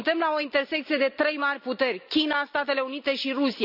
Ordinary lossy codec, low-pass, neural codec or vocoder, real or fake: none; 5.4 kHz; none; real